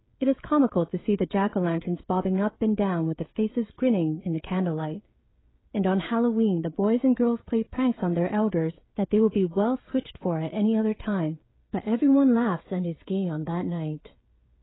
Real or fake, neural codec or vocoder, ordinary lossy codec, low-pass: fake; codec, 16 kHz, 16 kbps, FreqCodec, smaller model; AAC, 16 kbps; 7.2 kHz